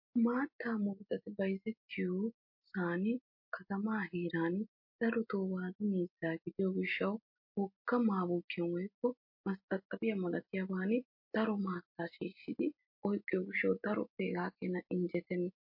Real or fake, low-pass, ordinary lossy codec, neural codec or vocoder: real; 5.4 kHz; MP3, 32 kbps; none